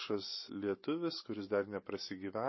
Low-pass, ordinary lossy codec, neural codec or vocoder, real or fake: 7.2 kHz; MP3, 24 kbps; none; real